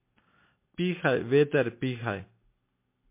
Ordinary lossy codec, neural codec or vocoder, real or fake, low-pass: MP3, 24 kbps; none; real; 3.6 kHz